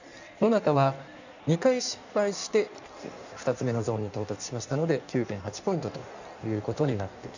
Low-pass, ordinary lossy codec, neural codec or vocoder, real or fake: 7.2 kHz; none; codec, 16 kHz in and 24 kHz out, 1.1 kbps, FireRedTTS-2 codec; fake